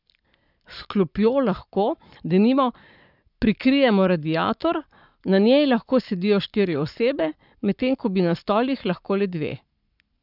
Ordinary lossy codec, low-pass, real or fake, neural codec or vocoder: none; 5.4 kHz; fake; codec, 44.1 kHz, 7.8 kbps, Pupu-Codec